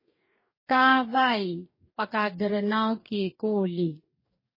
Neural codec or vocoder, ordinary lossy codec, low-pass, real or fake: codec, 44.1 kHz, 2.6 kbps, DAC; MP3, 24 kbps; 5.4 kHz; fake